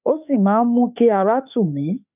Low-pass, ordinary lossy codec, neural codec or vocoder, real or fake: 3.6 kHz; none; autoencoder, 48 kHz, 32 numbers a frame, DAC-VAE, trained on Japanese speech; fake